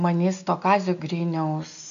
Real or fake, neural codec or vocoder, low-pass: real; none; 7.2 kHz